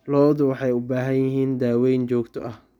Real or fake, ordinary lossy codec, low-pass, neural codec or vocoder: real; none; 19.8 kHz; none